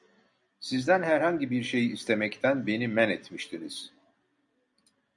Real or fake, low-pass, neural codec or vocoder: real; 10.8 kHz; none